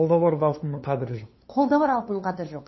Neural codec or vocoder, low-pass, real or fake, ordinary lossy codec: codec, 16 kHz, 2 kbps, FunCodec, trained on LibriTTS, 25 frames a second; 7.2 kHz; fake; MP3, 24 kbps